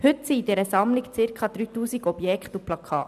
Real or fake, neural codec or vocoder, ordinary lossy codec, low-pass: real; none; none; 14.4 kHz